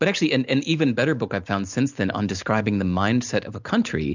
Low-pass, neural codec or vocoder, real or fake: 7.2 kHz; none; real